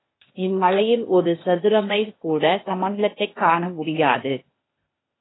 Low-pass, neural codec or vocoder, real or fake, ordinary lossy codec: 7.2 kHz; codec, 16 kHz, 0.8 kbps, ZipCodec; fake; AAC, 16 kbps